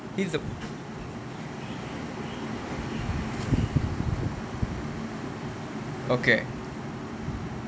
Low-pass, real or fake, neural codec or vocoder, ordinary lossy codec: none; real; none; none